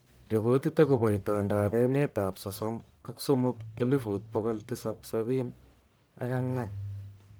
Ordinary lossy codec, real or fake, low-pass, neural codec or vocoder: none; fake; none; codec, 44.1 kHz, 1.7 kbps, Pupu-Codec